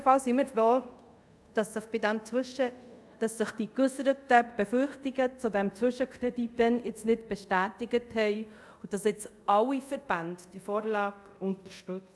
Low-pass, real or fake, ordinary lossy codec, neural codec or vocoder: none; fake; none; codec, 24 kHz, 0.5 kbps, DualCodec